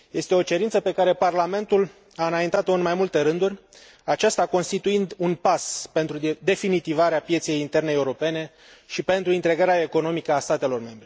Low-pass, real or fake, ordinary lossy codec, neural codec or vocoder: none; real; none; none